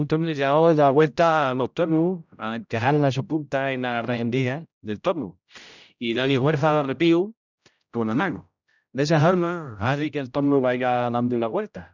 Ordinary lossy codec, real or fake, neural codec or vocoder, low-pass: none; fake; codec, 16 kHz, 0.5 kbps, X-Codec, HuBERT features, trained on general audio; 7.2 kHz